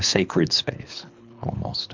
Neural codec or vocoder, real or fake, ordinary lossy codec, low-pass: codec, 24 kHz, 3 kbps, HILCodec; fake; MP3, 64 kbps; 7.2 kHz